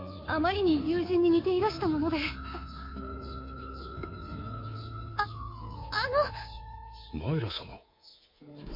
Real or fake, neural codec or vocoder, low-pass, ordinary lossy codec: fake; codec, 24 kHz, 3.1 kbps, DualCodec; 5.4 kHz; MP3, 32 kbps